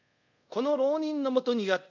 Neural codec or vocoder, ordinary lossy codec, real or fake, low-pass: codec, 24 kHz, 0.5 kbps, DualCodec; MP3, 64 kbps; fake; 7.2 kHz